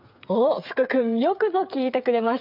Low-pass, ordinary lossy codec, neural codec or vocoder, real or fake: 5.4 kHz; none; codec, 16 kHz, 8 kbps, FreqCodec, smaller model; fake